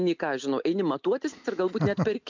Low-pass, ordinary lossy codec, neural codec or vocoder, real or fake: 7.2 kHz; MP3, 64 kbps; none; real